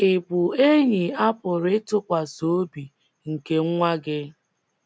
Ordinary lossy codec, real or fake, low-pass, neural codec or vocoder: none; real; none; none